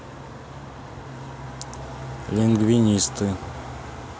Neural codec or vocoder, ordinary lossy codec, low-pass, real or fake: none; none; none; real